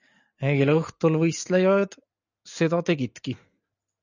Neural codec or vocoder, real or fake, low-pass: none; real; 7.2 kHz